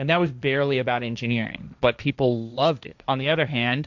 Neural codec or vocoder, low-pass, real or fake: codec, 16 kHz, 1.1 kbps, Voila-Tokenizer; 7.2 kHz; fake